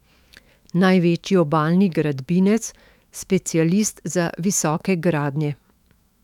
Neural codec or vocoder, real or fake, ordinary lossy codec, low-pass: autoencoder, 48 kHz, 128 numbers a frame, DAC-VAE, trained on Japanese speech; fake; none; 19.8 kHz